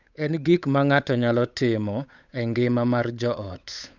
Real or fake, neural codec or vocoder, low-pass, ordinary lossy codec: fake; codec, 16 kHz, 8 kbps, FunCodec, trained on Chinese and English, 25 frames a second; 7.2 kHz; none